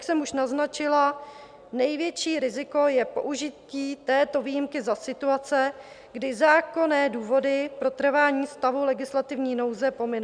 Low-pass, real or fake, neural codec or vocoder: 9.9 kHz; real; none